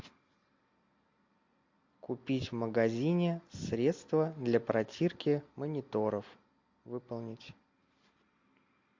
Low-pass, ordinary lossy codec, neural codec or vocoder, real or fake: 7.2 kHz; MP3, 48 kbps; none; real